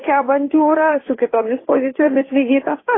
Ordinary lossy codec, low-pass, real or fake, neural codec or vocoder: AAC, 16 kbps; 7.2 kHz; fake; codec, 16 kHz, 2 kbps, FunCodec, trained on Chinese and English, 25 frames a second